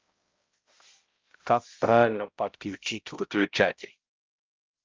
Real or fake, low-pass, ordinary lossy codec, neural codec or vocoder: fake; 7.2 kHz; Opus, 24 kbps; codec, 16 kHz, 0.5 kbps, X-Codec, HuBERT features, trained on balanced general audio